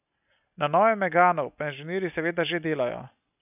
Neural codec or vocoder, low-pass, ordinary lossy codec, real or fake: none; 3.6 kHz; none; real